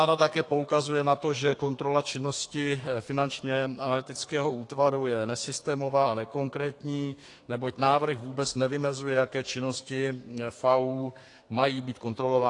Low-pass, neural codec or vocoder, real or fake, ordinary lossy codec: 10.8 kHz; codec, 32 kHz, 1.9 kbps, SNAC; fake; AAC, 48 kbps